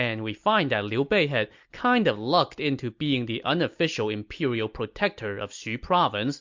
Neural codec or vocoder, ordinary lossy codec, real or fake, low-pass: none; MP3, 64 kbps; real; 7.2 kHz